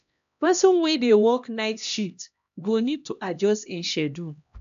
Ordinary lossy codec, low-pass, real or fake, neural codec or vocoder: AAC, 96 kbps; 7.2 kHz; fake; codec, 16 kHz, 1 kbps, X-Codec, HuBERT features, trained on LibriSpeech